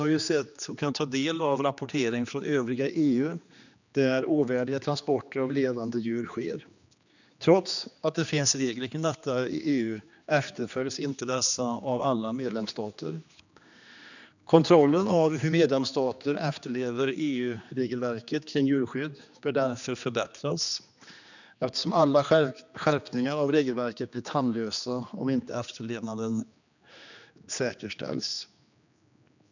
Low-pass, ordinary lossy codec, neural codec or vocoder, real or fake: 7.2 kHz; none; codec, 16 kHz, 2 kbps, X-Codec, HuBERT features, trained on general audio; fake